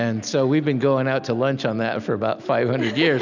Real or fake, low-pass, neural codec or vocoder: real; 7.2 kHz; none